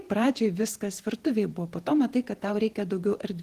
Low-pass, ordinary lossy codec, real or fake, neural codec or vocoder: 14.4 kHz; Opus, 16 kbps; fake; vocoder, 48 kHz, 128 mel bands, Vocos